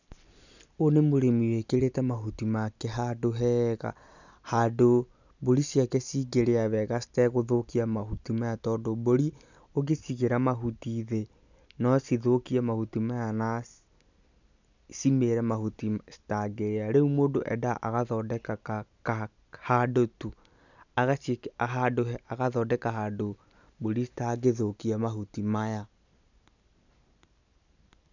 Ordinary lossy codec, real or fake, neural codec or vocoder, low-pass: none; real; none; 7.2 kHz